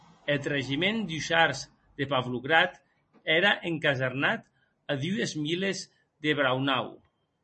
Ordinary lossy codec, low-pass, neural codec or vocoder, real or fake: MP3, 32 kbps; 10.8 kHz; none; real